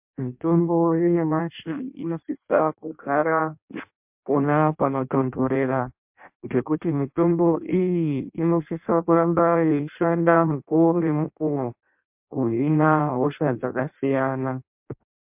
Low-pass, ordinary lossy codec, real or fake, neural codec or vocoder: 3.6 kHz; MP3, 32 kbps; fake; codec, 16 kHz in and 24 kHz out, 0.6 kbps, FireRedTTS-2 codec